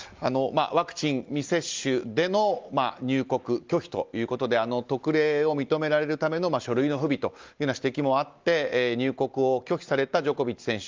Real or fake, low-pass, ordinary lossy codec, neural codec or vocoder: real; 7.2 kHz; Opus, 32 kbps; none